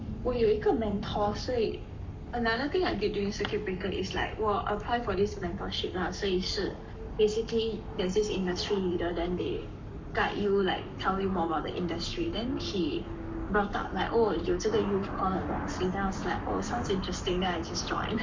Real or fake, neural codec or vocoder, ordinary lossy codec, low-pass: fake; codec, 44.1 kHz, 7.8 kbps, Pupu-Codec; MP3, 48 kbps; 7.2 kHz